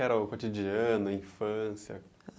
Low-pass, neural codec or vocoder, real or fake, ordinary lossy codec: none; none; real; none